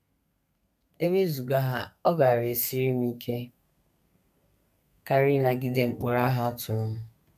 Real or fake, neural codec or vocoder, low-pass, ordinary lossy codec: fake; codec, 32 kHz, 1.9 kbps, SNAC; 14.4 kHz; none